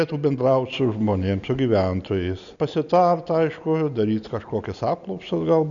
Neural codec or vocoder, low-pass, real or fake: none; 7.2 kHz; real